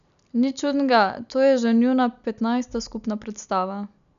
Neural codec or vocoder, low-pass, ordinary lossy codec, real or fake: none; 7.2 kHz; none; real